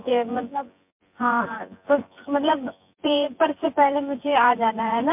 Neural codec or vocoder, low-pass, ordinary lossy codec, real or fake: vocoder, 24 kHz, 100 mel bands, Vocos; 3.6 kHz; MP3, 32 kbps; fake